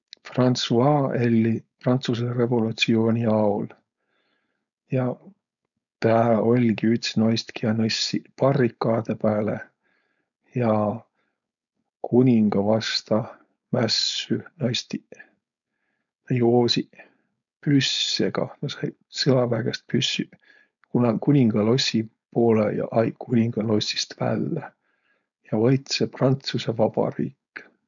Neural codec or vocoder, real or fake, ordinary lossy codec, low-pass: codec, 16 kHz, 4.8 kbps, FACodec; fake; none; 7.2 kHz